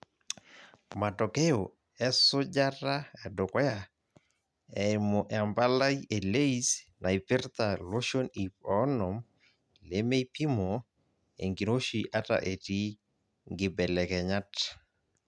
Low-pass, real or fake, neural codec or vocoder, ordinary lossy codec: none; real; none; none